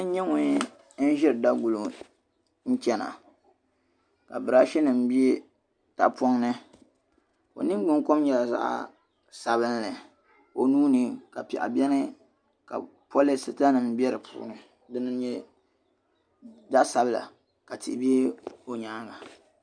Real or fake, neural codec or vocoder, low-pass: real; none; 9.9 kHz